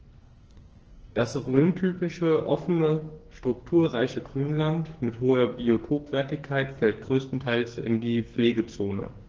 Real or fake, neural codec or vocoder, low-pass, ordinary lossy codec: fake; codec, 44.1 kHz, 2.6 kbps, SNAC; 7.2 kHz; Opus, 16 kbps